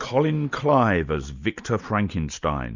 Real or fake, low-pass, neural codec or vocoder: real; 7.2 kHz; none